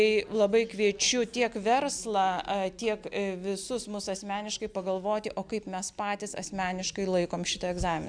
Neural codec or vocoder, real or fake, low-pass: none; real; 9.9 kHz